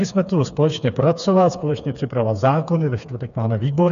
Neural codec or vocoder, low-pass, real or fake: codec, 16 kHz, 4 kbps, FreqCodec, smaller model; 7.2 kHz; fake